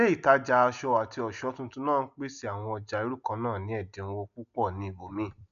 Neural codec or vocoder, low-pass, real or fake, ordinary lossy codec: none; 7.2 kHz; real; none